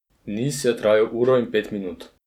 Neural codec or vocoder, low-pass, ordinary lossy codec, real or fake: vocoder, 44.1 kHz, 128 mel bands every 256 samples, BigVGAN v2; 19.8 kHz; none; fake